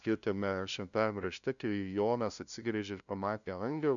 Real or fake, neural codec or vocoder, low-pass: fake; codec, 16 kHz, 0.5 kbps, FunCodec, trained on LibriTTS, 25 frames a second; 7.2 kHz